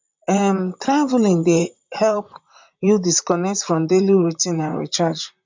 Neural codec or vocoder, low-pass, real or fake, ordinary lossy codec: vocoder, 44.1 kHz, 128 mel bands, Pupu-Vocoder; 7.2 kHz; fake; MP3, 64 kbps